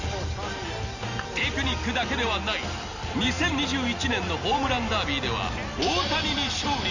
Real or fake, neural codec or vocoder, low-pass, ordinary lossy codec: real; none; 7.2 kHz; none